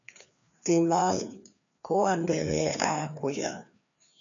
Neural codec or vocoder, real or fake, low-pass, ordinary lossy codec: codec, 16 kHz, 2 kbps, FreqCodec, larger model; fake; 7.2 kHz; MP3, 64 kbps